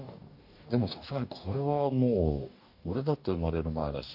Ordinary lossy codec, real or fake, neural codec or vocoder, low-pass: AAC, 48 kbps; fake; codec, 44.1 kHz, 2.6 kbps, DAC; 5.4 kHz